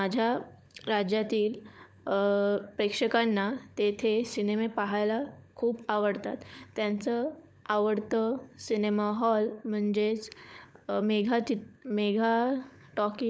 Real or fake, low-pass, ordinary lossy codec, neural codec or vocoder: fake; none; none; codec, 16 kHz, 16 kbps, FunCodec, trained on LibriTTS, 50 frames a second